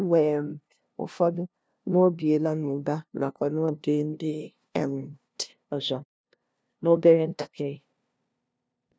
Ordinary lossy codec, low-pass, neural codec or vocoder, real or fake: none; none; codec, 16 kHz, 0.5 kbps, FunCodec, trained on LibriTTS, 25 frames a second; fake